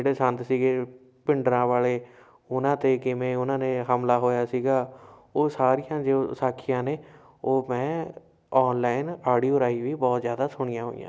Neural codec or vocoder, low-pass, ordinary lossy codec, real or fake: none; none; none; real